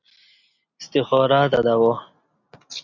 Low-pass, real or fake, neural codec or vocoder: 7.2 kHz; real; none